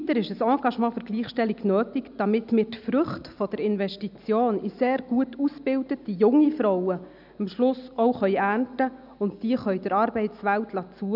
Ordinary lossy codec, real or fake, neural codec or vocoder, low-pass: none; real; none; 5.4 kHz